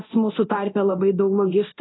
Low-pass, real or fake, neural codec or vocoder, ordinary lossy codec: 7.2 kHz; fake; codec, 24 kHz, 0.9 kbps, DualCodec; AAC, 16 kbps